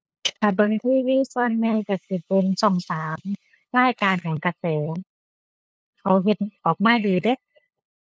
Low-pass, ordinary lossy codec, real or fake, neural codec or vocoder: none; none; fake; codec, 16 kHz, 8 kbps, FunCodec, trained on LibriTTS, 25 frames a second